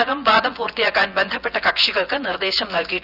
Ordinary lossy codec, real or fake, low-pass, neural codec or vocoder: Opus, 64 kbps; fake; 5.4 kHz; vocoder, 24 kHz, 100 mel bands, Vocos